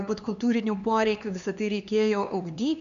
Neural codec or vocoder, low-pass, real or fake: codec, 16 kHz, 2 kbps, X-Codec, HuBERT features, trained on LibriSpeech; 7.2 kHz; fake